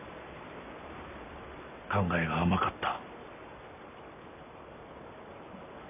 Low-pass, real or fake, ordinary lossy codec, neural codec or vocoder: 3.6 kHz; real; AAC, 32 kbps; none